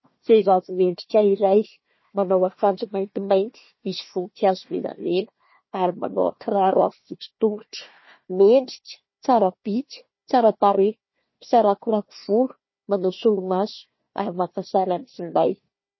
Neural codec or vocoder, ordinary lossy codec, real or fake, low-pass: codec, 16 kHz, 1 kbps, FunCodec, trained on Chinese and English, 50 frames a second; MP3, 24 kbps; fake; 7.2 kHz